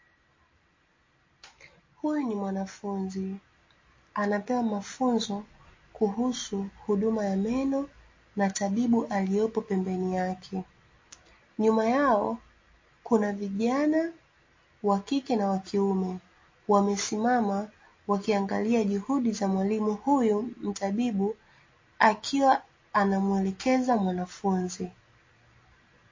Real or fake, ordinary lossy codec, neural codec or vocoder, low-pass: real; MP3, 32 kbps; none; 7.2 kHz